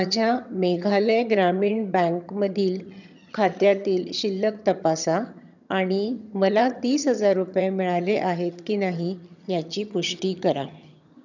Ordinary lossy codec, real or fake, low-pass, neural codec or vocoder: none; fake; 7.2 kHz; vocoder, 22.05 kHz, 80 mel bands, HiFi-GAN